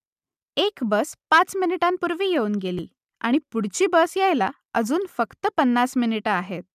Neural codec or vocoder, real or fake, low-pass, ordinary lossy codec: none; real; 14.4 kHz; none